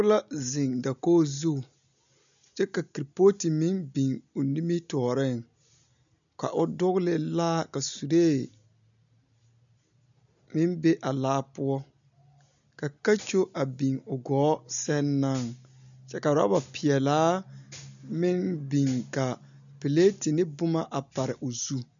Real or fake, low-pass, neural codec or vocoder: real; 7.2 kHz; none